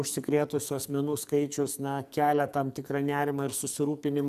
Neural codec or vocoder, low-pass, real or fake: codec, 44.1 kHz, 2.6 kbps, SNAC; 14.4 kHz; fake